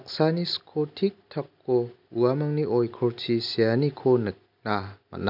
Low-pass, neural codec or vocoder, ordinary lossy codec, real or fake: 5.4 kHz; none; none; real